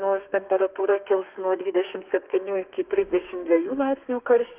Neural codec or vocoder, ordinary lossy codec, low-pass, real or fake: codec, 32 kHz, 1.9 kbps, SNAC; Opus, 24 kbps; 3.6 kHz; fake